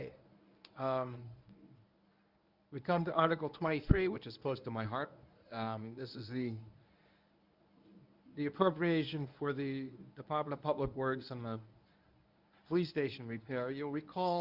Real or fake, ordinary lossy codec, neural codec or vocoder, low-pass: fake; Opus, 64 kbps; codec, 24 kHz, 0.9 kbps, WavTokenizer, medium speech release version 1; 5.4 kHz